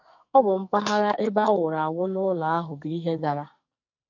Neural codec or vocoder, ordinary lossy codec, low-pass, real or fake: codec, 44.1 kHz, 2.6 kbps, SNAC; MP3, 48 kbps; 7.2 kHz; fake